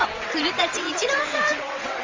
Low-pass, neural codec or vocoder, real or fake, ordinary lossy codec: 7.2 kHz; vocoder, 22.05 kHz, 80 mel bands, WaveNeXt; fake; Opus, 32 kbps